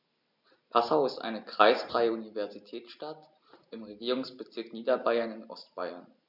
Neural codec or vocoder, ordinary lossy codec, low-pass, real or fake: none; none; 5.4 kHz; real